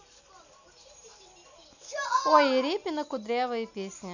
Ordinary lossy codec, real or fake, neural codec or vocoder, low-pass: none; real; none; 7.2 kHz